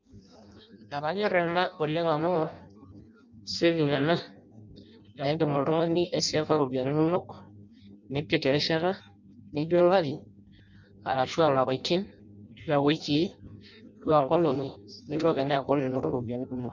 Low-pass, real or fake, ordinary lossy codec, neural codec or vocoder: 7.2 kHz; fake; MP3, 64 kbps; codec, 16 kHz in and 24 kHz out, 0.6 kbps, FireRedTTS-2 codec